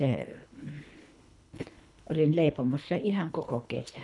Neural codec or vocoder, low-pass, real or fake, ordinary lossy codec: codec, 24 kHz, 3 kbps, HILCodec; 10.8 kHz; fake; none